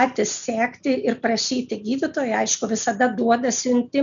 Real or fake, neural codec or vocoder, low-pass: real; none; 7.2 kHz